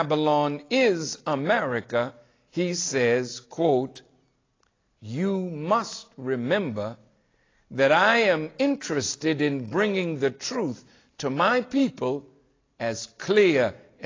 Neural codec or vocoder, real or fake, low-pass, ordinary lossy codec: none; real; 7.2 kHz; AAC, 32 kbps